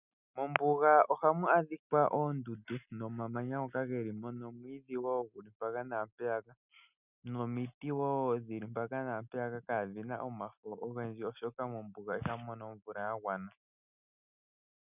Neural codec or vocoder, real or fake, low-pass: none; real; 3.6 kHz